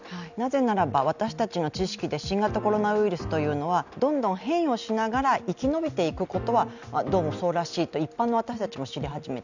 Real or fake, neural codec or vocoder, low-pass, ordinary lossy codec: real; none; 7.2 kHz; none